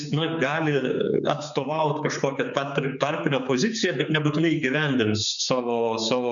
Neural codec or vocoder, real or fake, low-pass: codec, 16 kHz, 4 kbps, X-Codec, HuBERT features, trained on general audio; fake; 7.2 kHz